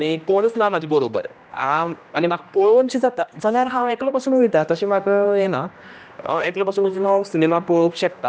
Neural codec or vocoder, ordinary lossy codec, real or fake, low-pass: codec, 16 kHz, 1 kbps, X-Codec, HuBERT features, trained on general audio; none; fake; none